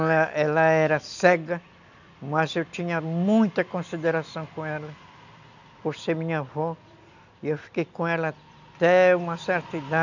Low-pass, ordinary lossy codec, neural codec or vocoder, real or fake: 7.2 kHz; none; none; real